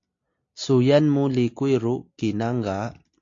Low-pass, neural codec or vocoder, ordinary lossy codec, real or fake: 7.2 kHz; none; AAC, 48 kbps; real